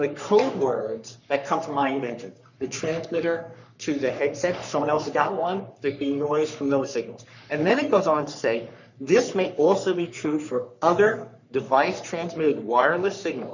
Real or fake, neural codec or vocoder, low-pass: fake; codec, 44.1 kHz, 3.4 kbps, Pupu-Codec; 7.2 kHz